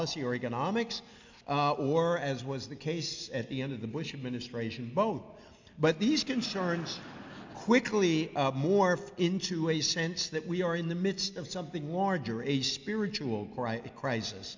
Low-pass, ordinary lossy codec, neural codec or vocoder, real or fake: 7.2 kHz; MP3, 64 kbps; none; real